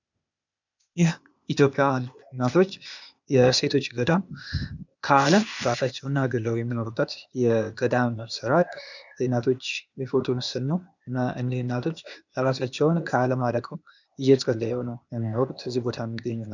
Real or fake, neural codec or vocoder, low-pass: fake; codec, 16 kHz, 0.8 kbps, ZipCodec; 7.2 kHz